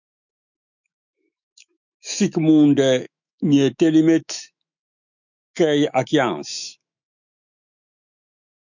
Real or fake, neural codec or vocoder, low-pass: fake; autoencoder, 48 kHz, 128 numbers a frame, DAC-VAE, trained on Japanese speech; 7.2 kHz